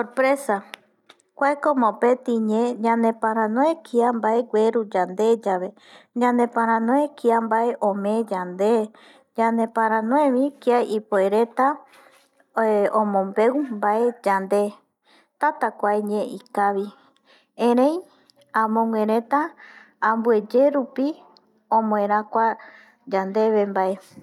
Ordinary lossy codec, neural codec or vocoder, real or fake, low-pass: none; none; real; 19.8 kHz